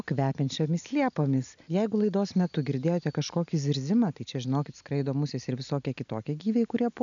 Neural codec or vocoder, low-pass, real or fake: none; 7.2 kHz; real